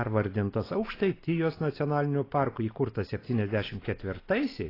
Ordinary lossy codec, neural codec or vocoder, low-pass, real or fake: AAC, 24 kbps; none; 5.4 kHz; real